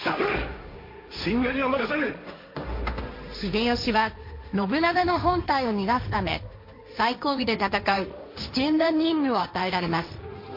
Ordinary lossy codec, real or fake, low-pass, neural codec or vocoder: MP3, 32 kbps; fake; 5.4 kHz; codec, 16 kHz, 1.1 kbps, Voila-Tokenizer